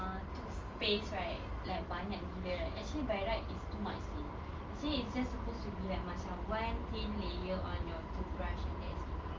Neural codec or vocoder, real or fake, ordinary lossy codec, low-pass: none; real; Opus, 32 kbps; 7.2 kHz